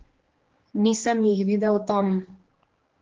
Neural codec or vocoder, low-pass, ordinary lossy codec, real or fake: codec, 16 kHz, 2 kbps, X-Codec, HuBERT features, trained on general audio; 7.2 kHz; Opus, 16 kbps; fake